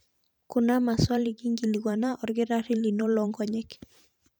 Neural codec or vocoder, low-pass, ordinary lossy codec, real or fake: vocoder, 44.1 kHz, 128 mel bands every 512 samples, BigVGAN v2; none; none; fake